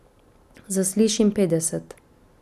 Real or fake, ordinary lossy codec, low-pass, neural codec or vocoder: real; none; 14.4 kHz; none